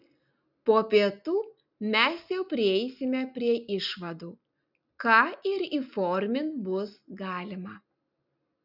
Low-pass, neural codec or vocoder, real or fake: 5.4 kHz; none; real